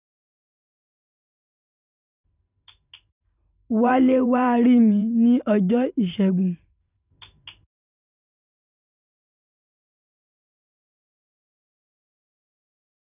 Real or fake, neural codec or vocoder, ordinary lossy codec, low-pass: fake; vocoder, 44.1 kHz, 128 mel bands every 512 samples, BigVGAN v2; none; 3.6 kHz